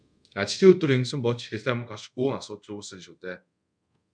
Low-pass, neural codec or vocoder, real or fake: 9.9 kHz; codec, 24 kHz, 0.5 kbps, DualCodec; fake